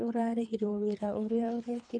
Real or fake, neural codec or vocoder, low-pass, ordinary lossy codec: fake; codec, 24 kHz, 3 kbps, HILCodec; 9.9 kHz; none